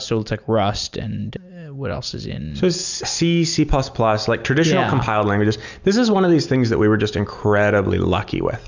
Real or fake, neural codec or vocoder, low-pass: real; none; 7.2 kHz